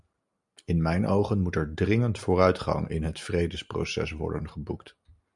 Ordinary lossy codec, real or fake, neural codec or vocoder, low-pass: MP3, 96 kbps; real; none; 10.8 kHz